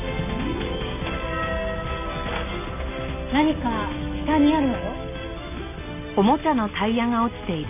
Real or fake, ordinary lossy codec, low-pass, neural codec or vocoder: real; none; 3.6 kHz; none